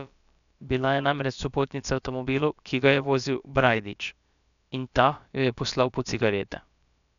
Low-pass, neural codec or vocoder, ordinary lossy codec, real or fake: 7.2 kHz; codec, 16 kHz, about 1 kbps, DyCAST, with the encoder's durations; none; fake